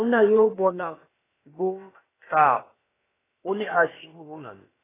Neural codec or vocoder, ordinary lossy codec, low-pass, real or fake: codec, 16 kHz, about 1 kbps, DyCAST, with the encoder's durations; AAC, 16 kbps; 3.6 kHz; fake